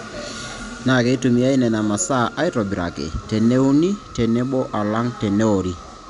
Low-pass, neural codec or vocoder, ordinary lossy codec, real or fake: 10.8 kHz; none; none; real